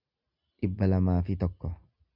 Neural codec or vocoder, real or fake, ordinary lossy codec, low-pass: none; real; Opus, 64 kbps; 5.4 kHz